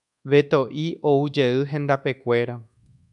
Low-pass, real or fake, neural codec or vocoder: 10.8 kHz; fake; codec, 24 kHz, 1.2 kbps, DualCodec